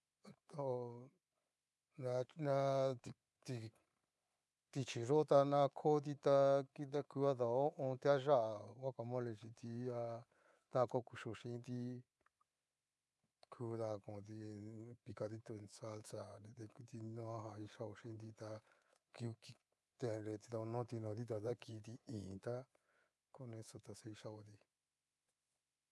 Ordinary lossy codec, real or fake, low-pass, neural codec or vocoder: none; fake; none; codec, 24 kHz, 3.1 kbps, DualCodec